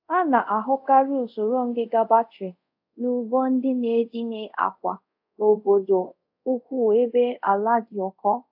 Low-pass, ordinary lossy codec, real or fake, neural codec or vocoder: 5.4 kHz; AAC, 48 kbps; fake; codec, 24 kHz, 0.5 kbps, DualCodec